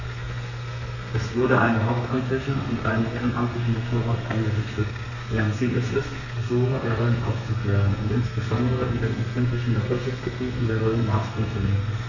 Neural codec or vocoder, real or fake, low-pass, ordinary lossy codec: codec, 32 kHz, 1.9 kbps, SNAC; fake; 7.2 kHz; none